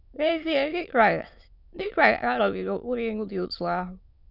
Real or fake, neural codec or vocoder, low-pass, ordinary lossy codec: fake; autoencoder, 22.05 kHz, a latent of 192 numbers a frame, VITS, trained on many speakers; 5.4 kHz; none